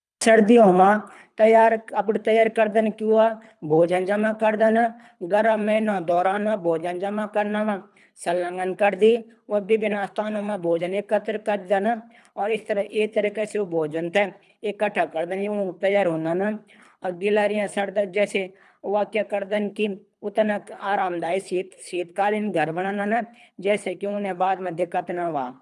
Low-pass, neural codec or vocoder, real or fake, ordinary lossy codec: none; codec, 24 kHz, 3 kbps, HILCodec; fake; none